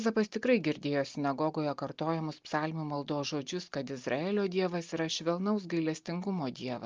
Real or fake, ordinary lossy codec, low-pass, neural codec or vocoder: real; Opus, 24 kbps; 7.2 kHz; none